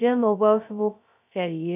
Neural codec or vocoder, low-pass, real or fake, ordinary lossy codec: codec, 16 kHz, 0.2 kbps, FocalCodec; 3.6 kHz; fake; none